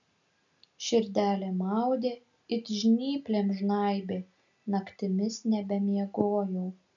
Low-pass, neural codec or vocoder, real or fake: 7.2 kHz; none; real